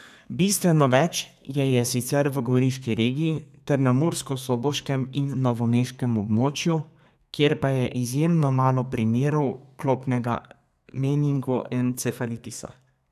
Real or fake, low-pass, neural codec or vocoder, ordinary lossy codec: fake; 14.4 kHz; codec, 32 kHz, 1.9 kbps, SNAC; none